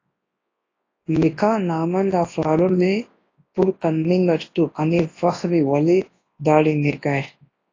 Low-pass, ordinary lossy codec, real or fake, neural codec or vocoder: 7.2 kHz; AAC, 32 kbps; fake; codec, 24 kHz, 0.9 kbps, WavTokenizer, large speech release